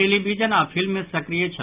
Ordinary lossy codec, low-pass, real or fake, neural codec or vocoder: Opus, 24 kbps; 3.6 kHz; real; none